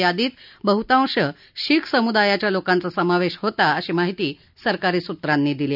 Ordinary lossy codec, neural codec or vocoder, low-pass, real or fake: none; none; 5.4 kHz; real